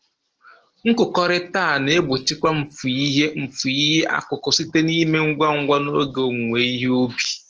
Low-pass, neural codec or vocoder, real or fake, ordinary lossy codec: 7.2 kHz; none; real; Opus, 16 kbps